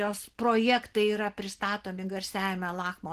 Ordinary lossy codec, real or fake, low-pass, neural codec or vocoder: Opus, 16 kbps; real; 14.4 kHz; none